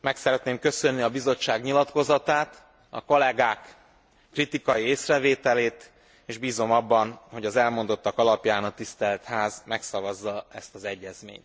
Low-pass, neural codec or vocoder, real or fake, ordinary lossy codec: none; none; real; none